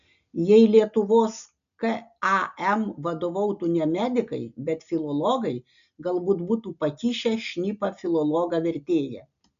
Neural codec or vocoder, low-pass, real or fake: none; 7.2 kHz; real